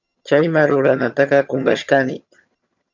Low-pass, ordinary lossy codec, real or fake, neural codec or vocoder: 7.2 kHz; MP3, 64 kbps; fake; vocoder, 22.05 kHz, 80 mel bands, HiFi-GAN